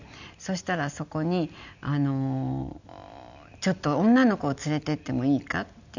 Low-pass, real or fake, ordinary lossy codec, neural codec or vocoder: 7.2 kHz; real; none; none